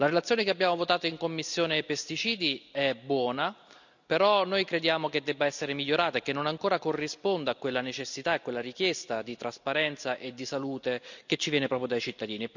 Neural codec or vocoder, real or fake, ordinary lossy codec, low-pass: none; real; none; 7.2 kHz